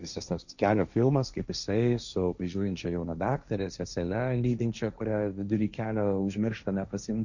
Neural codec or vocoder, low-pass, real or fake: codec, 16 kHz, 1.1 kbps, Voila-Tokenizer; 7.2 kHz; fake